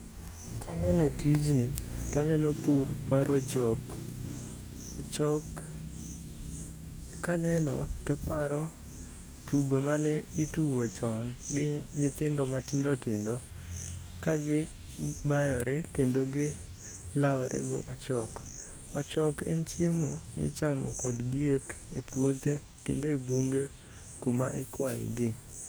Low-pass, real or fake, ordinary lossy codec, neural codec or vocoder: none; fake; none; codec, 44.1 kHz, 2.6 kbps, DAC